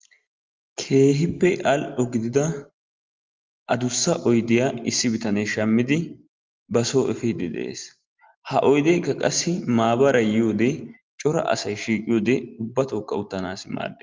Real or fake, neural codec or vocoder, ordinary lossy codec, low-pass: real; none; Opus, 32 kbps; 7.2 kHz